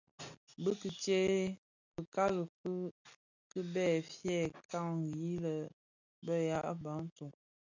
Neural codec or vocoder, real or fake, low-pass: none; real; 7.2 kHz